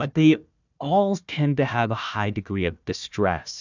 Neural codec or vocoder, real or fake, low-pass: codec, 16 kHz, 1 kbps, FunCodec, trained on Chinese and English, 50 frames a second; fake; 7.2 kHz